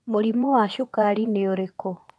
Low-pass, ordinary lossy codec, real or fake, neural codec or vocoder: none; none; fake; vocoder, 22.05 kHz, 80 mel bands, Vocos